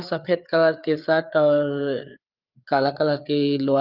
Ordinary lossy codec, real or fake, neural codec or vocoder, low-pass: Opus, 24 kbps; fake; codec, 16 kHz, 4 kbps, FreqCodec, larger model; 5.4 kHz